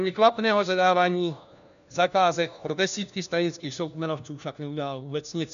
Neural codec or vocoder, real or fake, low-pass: codec, 16 kHz, 1 kbps, FunCodec, trained on LibriTTS, 50 frames a second; fake; 7.2 kHz